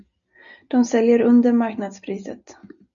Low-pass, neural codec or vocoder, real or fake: 7.2 kHz; none; real